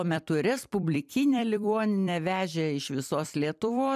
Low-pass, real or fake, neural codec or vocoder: 14.4 kHz; fake; vocoder, 44.1 kHz, 128 mel bands every 256 samples, BigVGAN v2